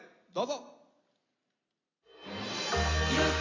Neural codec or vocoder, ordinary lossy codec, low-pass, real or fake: none; AAC, 32 kbps; 7.2 kHz; real